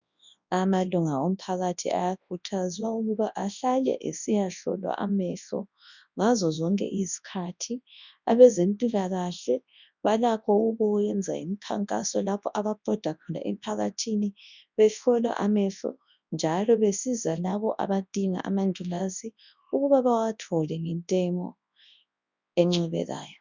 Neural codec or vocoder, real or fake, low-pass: codec, 24 kHz, 0.9 kbps, WavTokenizer, large speech release; fake; 7.2 kHz